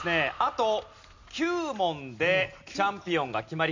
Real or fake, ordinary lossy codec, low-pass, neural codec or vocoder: real; MP3, 64 kbps; 7.2 kHz; none